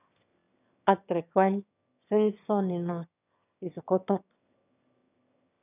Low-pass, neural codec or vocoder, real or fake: 3.6 kHz; autoencoder, 22.05 kHz, a latent of 192 numbers a frame, VITS, trained on one speaker; fake